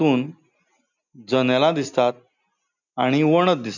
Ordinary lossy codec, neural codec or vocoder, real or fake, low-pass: none; none; real; 7.2 kHz